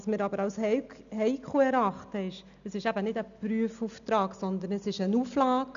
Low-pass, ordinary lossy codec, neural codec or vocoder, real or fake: 7.2 kHz; MP3, 96 kbps; none; real